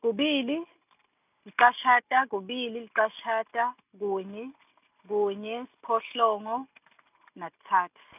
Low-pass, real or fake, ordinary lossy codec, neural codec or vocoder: 3.6 kHz; real; none; none